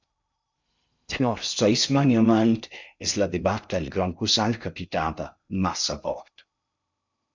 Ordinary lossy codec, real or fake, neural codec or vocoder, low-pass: MP3, 64 kbps; fake; codec, 16 kHz in and 24 kHz out, 0.6 kbps, FocalCodec, streaming, 2048 codes; 7.2 kHz